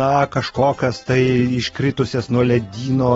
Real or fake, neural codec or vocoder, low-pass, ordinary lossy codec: real; none; 10.8 kHz; AAC, 24 kbps